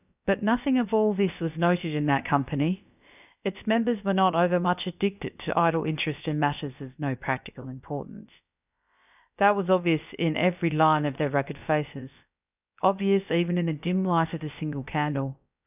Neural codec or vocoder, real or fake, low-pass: codec, 16 kHz, about 1 kbps, DyCAST, with the encoder's durations; fake; 3.6 kHz